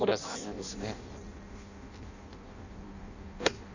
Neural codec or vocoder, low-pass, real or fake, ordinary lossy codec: codec, 16 kHz in and 24 kHz out, 0.6 kbps, FireRedTTS-2 codec; 7.2 kHz; fake; none